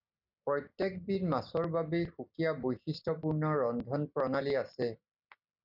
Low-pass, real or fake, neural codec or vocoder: 5.4 kHz; real; none